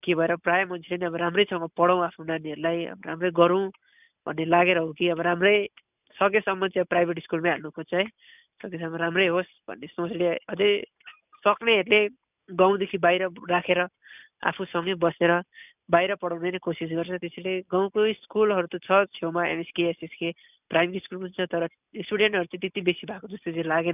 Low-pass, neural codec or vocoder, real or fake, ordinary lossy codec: 3.6 kHz; none; real; none